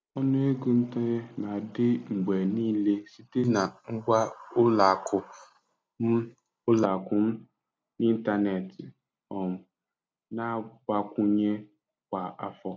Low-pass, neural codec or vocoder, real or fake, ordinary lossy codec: none; none; real; none